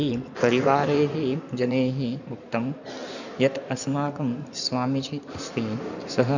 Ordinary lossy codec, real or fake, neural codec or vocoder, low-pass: none; fake; codec, 16 kHz in and 24 kHz out, 2.2 kbps, FireRedTTS-2 codec; 7.2 kHz